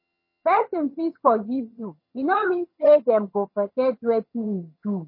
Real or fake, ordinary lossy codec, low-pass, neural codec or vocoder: fake; MP3, 24 kbps; 5.4 kHz; vocoder, 22.05 kHz, 80 mel bands, HiFi-GAN